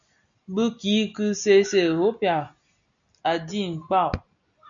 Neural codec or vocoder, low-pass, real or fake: none; 7.2 kHz; real